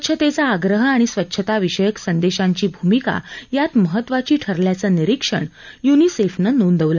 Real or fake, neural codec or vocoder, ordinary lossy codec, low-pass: real; none; none; 7.2 kHz